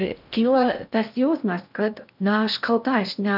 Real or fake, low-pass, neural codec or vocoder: fake; 5.4 kHz; codec, 16 kHz in and 24 kHz out, 0.6 kbps, FocalCodec, streaming, 4096 codes